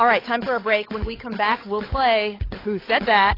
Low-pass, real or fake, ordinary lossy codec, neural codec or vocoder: 5.4 kHz; fake; AAC, 24 kbps; codec, 16 kHz, 16 kbps, FunCodec, trained on LibriTTS, 50 frames a second